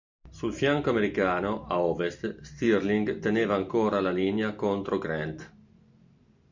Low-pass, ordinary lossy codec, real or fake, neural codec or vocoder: 7.2 kHz; MP3, 64 kbps; real; none